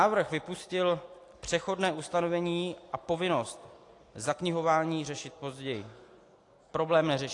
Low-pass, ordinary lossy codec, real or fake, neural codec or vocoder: 10.8 kHz; AAC, 48 kbps; real; none